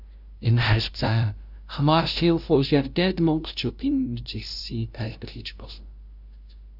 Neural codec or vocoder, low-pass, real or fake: codec, 16 kHz, 0.5 kbps, FunCodec, trained on LibriTTS, 25 frames a second; 5.4 kHz; fake